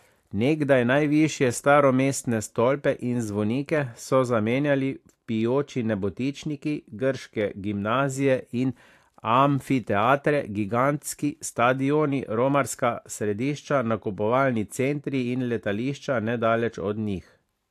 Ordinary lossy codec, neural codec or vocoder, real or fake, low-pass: AAC, 64 kbps; vocoder, 44.1 kHz, 128 mel bands every 512 samples, BigVGAN v2; fake; 14.4 kHz